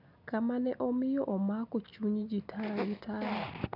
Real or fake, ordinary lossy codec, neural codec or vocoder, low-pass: real; none; none; 5.4 kHz